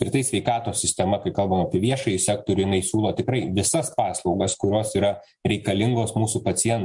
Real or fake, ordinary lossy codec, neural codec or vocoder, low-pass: real; MP3, 64 kbps; none; 10.8 kHz